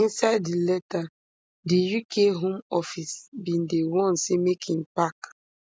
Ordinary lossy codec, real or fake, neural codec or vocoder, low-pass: none; real; none; none